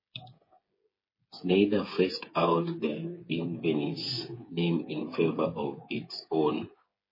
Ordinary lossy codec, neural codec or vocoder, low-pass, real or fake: MP3, 24 kbps; codec, 16 kHz, 8 kbps, FreqCodec, smaller model; 5.4 kHz; fake